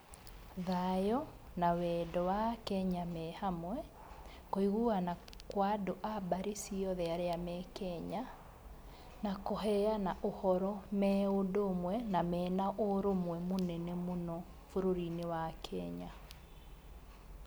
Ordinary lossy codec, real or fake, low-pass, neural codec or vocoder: none; real; none; none